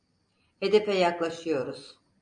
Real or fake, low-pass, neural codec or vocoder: real; 9.9 kHz; none